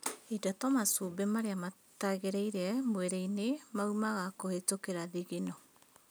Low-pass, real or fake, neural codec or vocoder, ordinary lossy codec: none; real; none; none